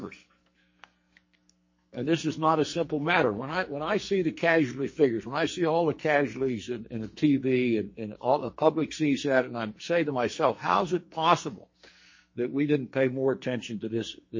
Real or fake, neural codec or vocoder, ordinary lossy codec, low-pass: fake; codec, 44.1 kHz, 2.6 kbps, SNAC; MP3, 32 kbps; 7.2 kHz